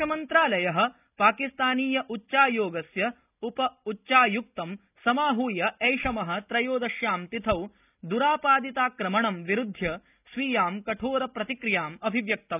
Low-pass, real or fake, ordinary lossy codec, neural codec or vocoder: 3.6 kHz; real; none; none